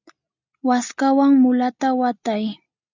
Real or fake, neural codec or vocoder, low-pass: real; none; 7.2 kHz